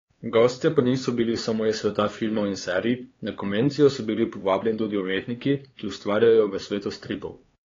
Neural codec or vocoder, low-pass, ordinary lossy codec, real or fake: codec, 16 kHz, 4 kbps, X-Codec, HuBERT features, trained on LibriSpeech; 7.2 kHz; AAC, 32 kbps; fake